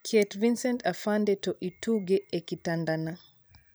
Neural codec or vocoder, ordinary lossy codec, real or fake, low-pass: vocoder, 44.1 kHz, 128 mel bands every 512 samples, BigVGAN v2; none; fake; none